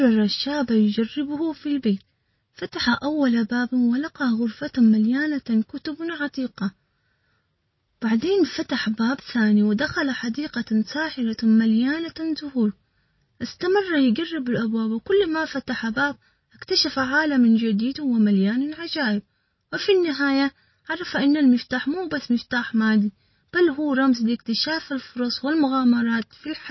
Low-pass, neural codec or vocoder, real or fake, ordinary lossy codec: 7.2 kHz; none; real; MP3, 24 kbps